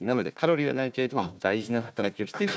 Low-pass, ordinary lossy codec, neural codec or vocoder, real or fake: none; none; codec, 16 kHz, 1 kbps, FunCodec, trained on Chinese and English, 50 frames a second; fake